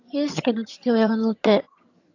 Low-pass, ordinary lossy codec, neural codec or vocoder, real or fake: 7.2 kHz; AAC, 48 kbps; vocoder, 22.05 kHz, 80 mel bands, HiFi-GAN; fake